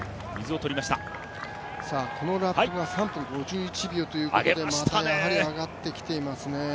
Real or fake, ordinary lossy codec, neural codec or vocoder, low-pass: real; none; none; none